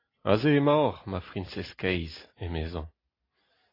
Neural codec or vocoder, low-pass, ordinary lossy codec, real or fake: none; 5.4 kHz; AAC, 24 kbps; real